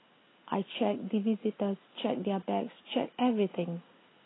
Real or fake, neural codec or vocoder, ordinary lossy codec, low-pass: real; none; AAC, 16 kbps; 7.2 kHz